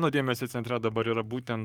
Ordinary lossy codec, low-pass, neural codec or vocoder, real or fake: Opus, 24 kbps; 19.8 kHz; codec, 44.1 kHz, 7.8 kbps, Pupu-Codec; fake